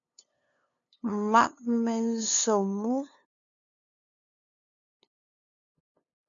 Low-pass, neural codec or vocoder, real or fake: 7.2 kHz; codec, 16 kHz, 2 kbps, FunCodec, trained on LibriTTS, 25 frames a second; fake